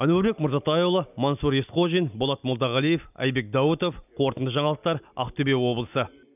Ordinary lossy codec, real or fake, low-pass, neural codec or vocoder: none; real; 3.6 kHz; none